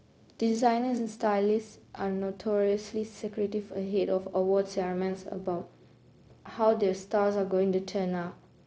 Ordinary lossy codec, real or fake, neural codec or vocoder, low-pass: none; fake; codec, 16 kHz, 0.4 kbps, LongCat-Audio-Codec; none